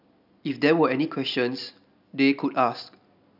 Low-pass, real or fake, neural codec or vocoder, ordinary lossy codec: 5.4 kHz; real; none; none